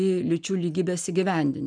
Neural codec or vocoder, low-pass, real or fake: none; 9.9 kHz; real